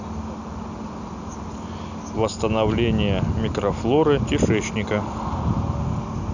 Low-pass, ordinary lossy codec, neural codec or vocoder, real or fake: 7.2 kHz; none; none; real